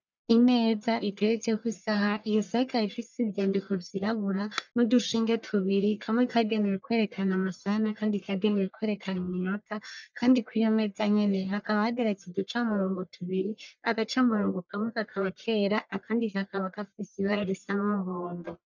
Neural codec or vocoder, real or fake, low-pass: codec, 44.1 kHz, 1.7 kbps, Pupu-Codec; fake; 7.2 kHz